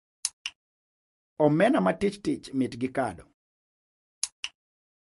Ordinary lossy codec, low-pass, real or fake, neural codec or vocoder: MP3, 48 kbps; 14.4 kHz; real; none